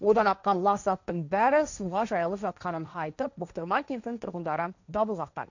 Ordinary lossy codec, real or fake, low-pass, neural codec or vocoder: none; fake; 7.2 kHz; codec, 16 kHz, 1.1 kbps, Voila-Tokenizer